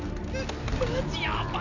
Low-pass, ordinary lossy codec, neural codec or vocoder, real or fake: 7.2 kHz; none; none; real